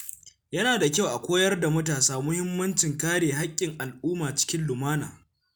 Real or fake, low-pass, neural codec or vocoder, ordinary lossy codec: real; none; none; none